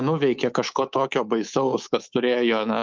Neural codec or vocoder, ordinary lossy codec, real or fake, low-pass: codec, 24 kHz, 3.1 kbps, DualCodec; Opus, 24 kbps; fake; 7.2 kHz